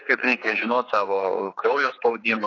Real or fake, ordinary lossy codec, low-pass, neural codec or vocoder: fake; AAC, 32 kbps; 7.2 kHz; codec, 16 kHz, 4 kbps, X-Codec, HuBERT features, trained on general audio